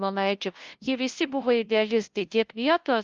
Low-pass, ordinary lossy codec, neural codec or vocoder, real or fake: 7.2 kHz; Opus, 32 kbps; codec, 16 kHz, 0.5 kbps, FunCodec, trained on LibriTTS, 25 frames a second; fake